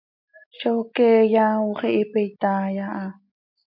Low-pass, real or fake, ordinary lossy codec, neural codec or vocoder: 5.4 kHz; real; MP3, 48 kbps; none